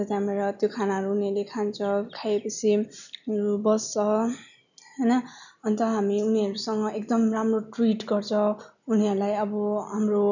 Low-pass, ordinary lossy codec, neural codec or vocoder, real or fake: 7.2 kHz; none; none; real